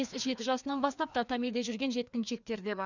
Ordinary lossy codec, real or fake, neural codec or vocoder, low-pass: none; fake; codec, 16 kHz, 2 kbps, FreqCodec, larger model; 7.2 kHz